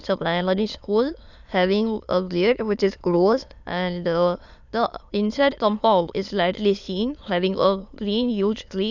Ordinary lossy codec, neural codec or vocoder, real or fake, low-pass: none; autoencoder, 22.05 kHz, a latent of 192 numbers a frame, VITS, trained on many speakers; fake; 7.2 kHz